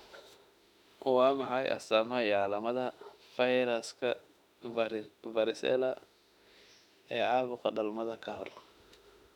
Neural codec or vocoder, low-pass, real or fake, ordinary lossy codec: autoencoder, 48 kHz, 32 numbers a frame, DAC-VAE, trained on Japanese speech; 19.8 kHz; fake; none